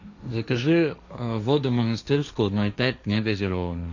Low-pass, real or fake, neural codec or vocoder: 7.2 kHz; fake; codec, 16 kHz, 1.1 kbps, Voila-Tokenizer